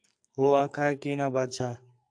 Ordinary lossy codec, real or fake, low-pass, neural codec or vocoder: AAC, 64 kbps; fake; 9.9 kHz; codec, 44.1 kHz, 2.6 kbps, SNAC